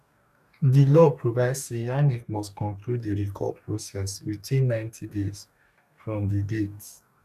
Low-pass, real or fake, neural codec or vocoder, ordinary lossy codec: 14.4 kHz; fake; codec, 44.1 kHz, 2.6 kbps, DAC; none